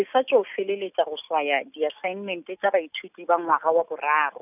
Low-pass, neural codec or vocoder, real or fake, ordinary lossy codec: 3.6 kHz; none; real; none